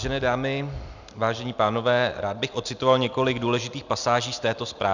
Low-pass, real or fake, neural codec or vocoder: 7.2 kHz; real; none